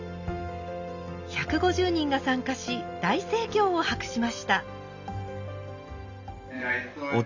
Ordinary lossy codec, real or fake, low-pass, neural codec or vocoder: none; real; 7.2 kHz; none